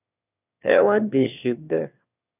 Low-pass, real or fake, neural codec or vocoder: 3.6 kHz; fake; autoencoder, 22.05 kHz, a latent of 192 numbers a frame, VITS, trained on one speaker